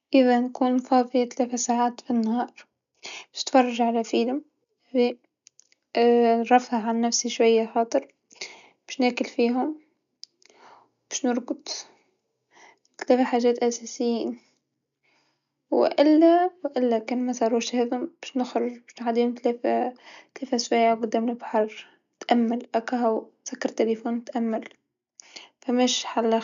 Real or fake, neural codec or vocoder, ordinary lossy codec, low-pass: real; none; none; 7.2 kHz